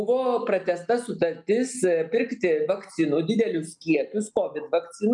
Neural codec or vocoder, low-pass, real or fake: none; 10.8 kHz; real